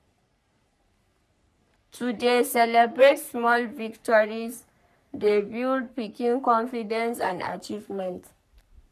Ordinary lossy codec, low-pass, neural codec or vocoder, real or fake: none; 14.4 kHz; codec, 44.1 kHz, 3.4 kbps, Pupu-Codec; fake